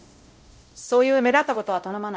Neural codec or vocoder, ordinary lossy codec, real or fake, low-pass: codec, 16 kHz, 0.5 kbps, X-Codec, WavLM features, trained on Multilingual LibriSpeech; none; fake; none